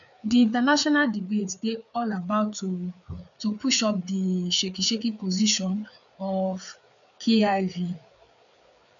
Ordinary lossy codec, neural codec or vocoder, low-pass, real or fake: none; codec, 16 kHz, 4 kbps, FreqCodec, larger model; 7.2 kHz; fake